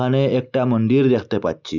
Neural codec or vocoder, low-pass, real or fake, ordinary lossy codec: none; 7.2 kHz; real; none